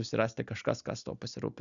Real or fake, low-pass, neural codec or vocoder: real; 7.2 kHz; none